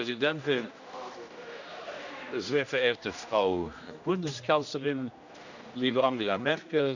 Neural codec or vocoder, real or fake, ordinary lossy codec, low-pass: codec, 16 kHz, 1 kbps, X-Codec, HuBERT features, trained on general audio; fake; none; 7.2 kHz